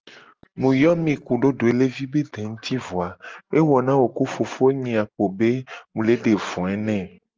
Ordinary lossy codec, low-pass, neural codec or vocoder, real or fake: Opus, 24 kbps; 7.2 kHz; none; real